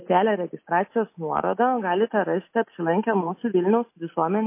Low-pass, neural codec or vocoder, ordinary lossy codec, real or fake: 3.6 kHz; none; MP3, 24 kbps; real